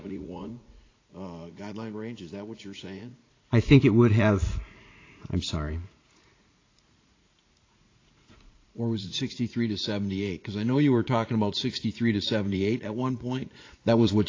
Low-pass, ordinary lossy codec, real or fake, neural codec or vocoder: 7.2 kHz; AAC, 32 kbps; fake; vocoder, 44.1 kHz, 80 mel bands, Vocos